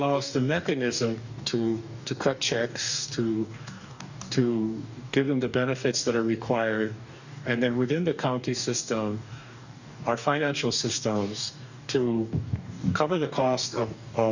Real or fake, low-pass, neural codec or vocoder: fake; 7.2 kHz; codec, 44.1 kHz, 2.6 kbps, DAC